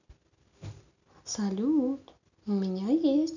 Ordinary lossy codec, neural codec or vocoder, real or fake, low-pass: none; none; real; 7.2 kHz